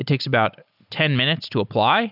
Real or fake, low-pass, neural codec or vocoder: real; 5.4 kHz; none